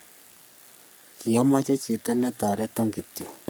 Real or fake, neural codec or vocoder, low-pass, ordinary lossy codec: fake; codec, 44.1 kHz, 3.4 kbps, Pupu-Codec; none; none